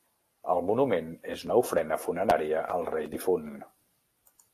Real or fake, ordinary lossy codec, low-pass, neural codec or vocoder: real; AAC, 48 kbps; 14.4 kHz; none